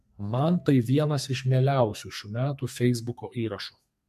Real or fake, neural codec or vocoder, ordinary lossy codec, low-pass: fake; codec, 32 kHz, 1.9 kbps, SNAC; MP3, 64 kbps; 14.4 kHz